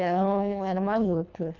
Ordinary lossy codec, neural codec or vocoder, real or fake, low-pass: none; codec, 24 kHz, 1.5 kbps, HILCodec; fake; 7.2 kHz